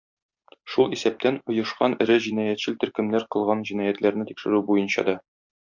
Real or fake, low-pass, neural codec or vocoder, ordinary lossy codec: real; 7.2 kHz; none; MP3, 64 kbps